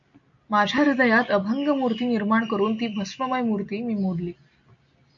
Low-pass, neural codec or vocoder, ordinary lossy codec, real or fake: 7.2 kHz; none; MP3, 64 kbps; real